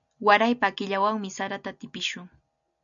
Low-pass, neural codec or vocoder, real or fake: 7.2 kHz; none; real